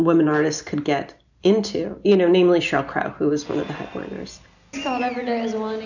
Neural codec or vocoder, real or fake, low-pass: none; real; 7.2 kHz